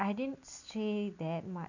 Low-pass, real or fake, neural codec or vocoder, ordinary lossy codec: 7.2 kHz; real; none; none